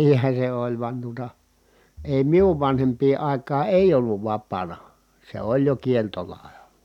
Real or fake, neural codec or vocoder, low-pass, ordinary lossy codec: real; none; 19.8 kHz; none